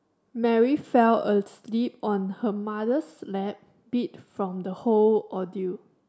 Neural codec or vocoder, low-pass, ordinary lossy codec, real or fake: none; none; none; real